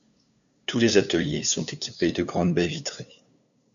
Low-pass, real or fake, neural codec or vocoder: 7.2 kHz; fake; codec, 16 kHz, 2 kbps, FunCodec, trained on LibriTTS, 25 frames a second